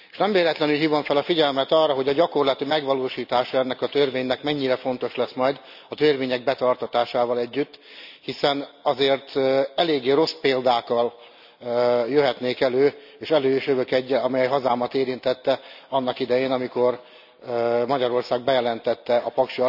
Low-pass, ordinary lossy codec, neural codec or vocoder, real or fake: 5.4 kHz; none; none; real